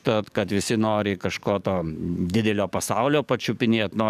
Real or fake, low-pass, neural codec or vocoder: fake; 14.4 kHz; codec, 44.1 kHz, 7.8 kbps, DAC